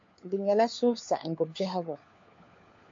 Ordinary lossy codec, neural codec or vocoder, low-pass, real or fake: MP3, 48 kbps; codec, 16 kHz, 4 kbps, FunCodec, trained on LibriTTS, 50 frames a second; 7.2 kHz; fake